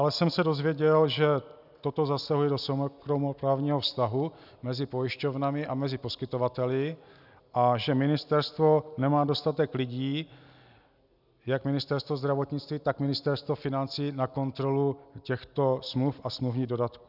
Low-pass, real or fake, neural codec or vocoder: 5.4 kHz; real; none